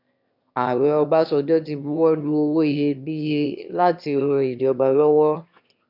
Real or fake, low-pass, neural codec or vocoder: fake; 5.4 kHz; autoencoder, 22.05 kHz, a latent of 192 numbers a frame, VITS, trained on one speaker